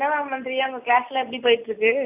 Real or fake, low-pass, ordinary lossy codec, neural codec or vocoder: real; 3.6 kHz; AAC, 32 kbps; none